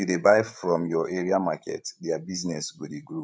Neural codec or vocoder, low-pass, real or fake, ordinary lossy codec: codec, 16 kHz, 16 kbps, FreqCodec, larger model; none; fake; none